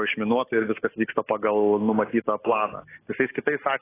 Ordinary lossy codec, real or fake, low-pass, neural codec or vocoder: AAC, 16 kbps; real; 3.6 kHz; none